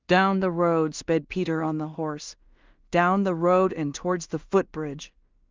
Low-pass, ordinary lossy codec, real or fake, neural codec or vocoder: 7.2 kHz; Opus, 24 kbps; fake; codec, 16 kHz in and 24 kHz out, 0.4 kbps, LongCat-Audio-Codec, two codebook decoder